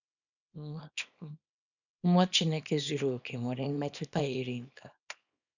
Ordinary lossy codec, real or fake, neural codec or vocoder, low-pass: none; fake; codec, 24 kHz, 0.9 kbps, WavTokenizer, small release; 7.2 kHz